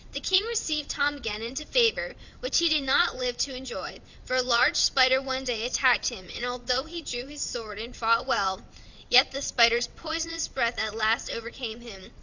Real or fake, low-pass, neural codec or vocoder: fake; 7.2 kHz; vocoder, 22.05 kHz, 80 mel bands, WaveNeXt